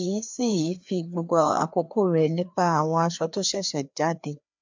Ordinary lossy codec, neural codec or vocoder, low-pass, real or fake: MP3, 64 kbps; codec, 16 kHz, 2 kbps, FreqCodec, larger model; 7.2 kHz; fake